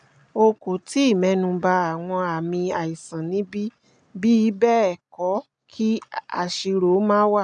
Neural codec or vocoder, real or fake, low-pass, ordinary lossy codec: none; real; 9.9 kHz; none